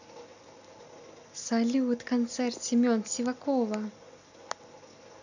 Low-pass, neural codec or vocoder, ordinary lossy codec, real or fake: 7.2 kHz; none; none; real